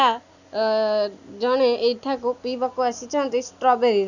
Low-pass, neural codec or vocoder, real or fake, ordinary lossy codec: 7.2 kHz; none; real; none